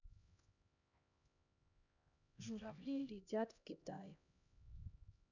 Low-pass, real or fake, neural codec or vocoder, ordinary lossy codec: 7.2 kHz; fake; codec, 16 kHz, 1 kbps, X-Codec, HuBERT features, trained on LibriSpeech; none